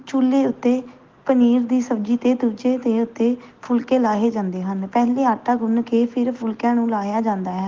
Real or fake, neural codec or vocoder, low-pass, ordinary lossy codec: real; none; 7.2 kHz; Opus, 16 kbps